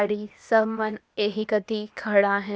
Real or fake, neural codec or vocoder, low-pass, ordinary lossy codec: fake; codec, 16 kHz, 0.8 kbps, ZipCodec; none; none